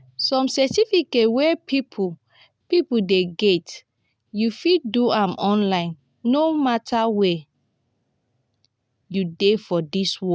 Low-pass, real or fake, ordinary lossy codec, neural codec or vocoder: none; real; none; none